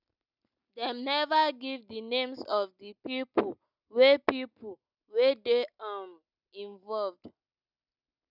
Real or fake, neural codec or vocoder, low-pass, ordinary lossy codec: real; none; 5.4 kHz; none